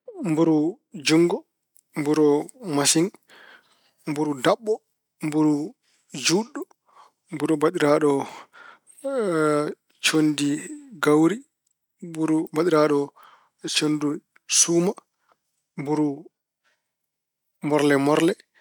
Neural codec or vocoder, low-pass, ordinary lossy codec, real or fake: none; 19.8 kHz; none; real